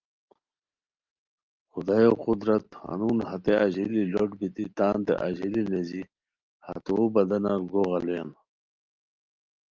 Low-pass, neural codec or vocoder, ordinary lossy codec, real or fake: 7.2 kHz; none; Opus, 32 kbps; real